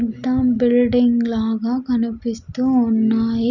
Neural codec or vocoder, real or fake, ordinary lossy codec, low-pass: none; real; none; 7.2 kHz